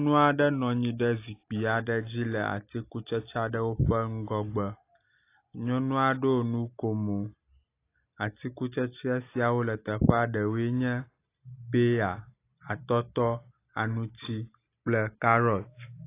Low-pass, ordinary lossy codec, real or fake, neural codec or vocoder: 3.6 kHz; AAC, 24 kbps; real; none